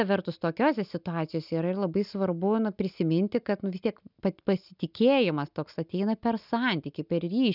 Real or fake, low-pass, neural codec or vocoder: real; 5.4 kHz; none